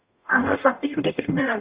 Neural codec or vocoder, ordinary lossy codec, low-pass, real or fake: codec, 44.1 kHz, 0.9 kbps, DAC; Opus, 64 kbps; 3.6 kHz; fake